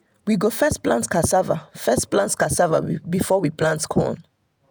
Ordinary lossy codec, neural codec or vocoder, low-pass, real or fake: none; vocoder, 48 kHz, 128 mel bands, Vocos; none; fake